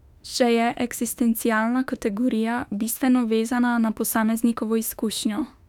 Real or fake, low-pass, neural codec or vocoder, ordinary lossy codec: fake; 19.8 kHz; autoencoder, 48 kHz, 32 numbers a frame, DAC-VAE, trained on Japanese speech; none